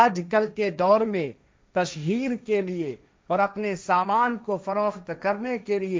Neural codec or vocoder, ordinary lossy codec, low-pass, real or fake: codec, 16 kHz, 1.1 kbps, Voila-Tokenizer; none; none; fake